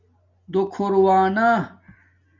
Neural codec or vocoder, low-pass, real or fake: none; 7.2 kHz; real